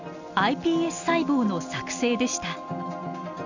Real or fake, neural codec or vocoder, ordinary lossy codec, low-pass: real; none; none; 7.2 kHz